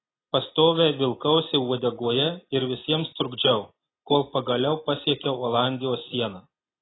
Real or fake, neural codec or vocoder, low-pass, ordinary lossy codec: real; none; 7.2 kHz; AAC, 16 kbps